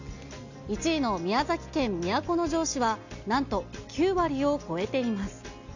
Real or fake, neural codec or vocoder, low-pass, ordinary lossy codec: real; none; 7.2 kHz; none